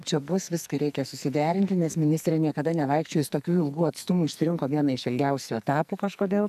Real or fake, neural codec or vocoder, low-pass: fake; codec, 44.1 kHz, 2.6 kbps, SNAC; 14.4 kHz